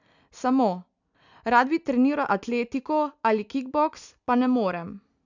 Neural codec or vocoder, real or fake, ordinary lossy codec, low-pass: none; real; none; 7.2 kHz